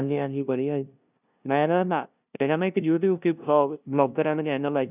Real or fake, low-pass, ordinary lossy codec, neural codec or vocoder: fake; 3.6 kHz; none; codec, 16 kHz, 0.5 kbps, FunCodec, trained on LibriTTS, 25 frames a second